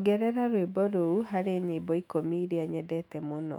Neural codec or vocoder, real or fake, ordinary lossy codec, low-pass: autoencoder, 48 kHz, 128 numbers a frame, DAC-VAE, trained on Japanese speech; fake; MP3, 96 kbps; 19.8 kHz